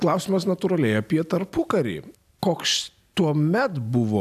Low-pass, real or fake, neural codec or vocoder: 14.4 kHz; real; none